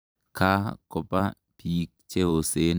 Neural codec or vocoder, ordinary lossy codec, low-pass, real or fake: vocoder, 44.1 kHz, 128 mel bands every 256 samples, BigVGAN v2; none; none; fake